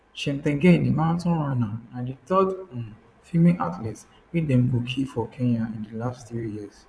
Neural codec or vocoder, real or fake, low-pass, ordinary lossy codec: vocoder, 22.05 kHz, 80 mel bands, Vocos; fake; none; none